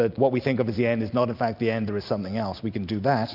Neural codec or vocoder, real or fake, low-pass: none; real; 5.4 kHz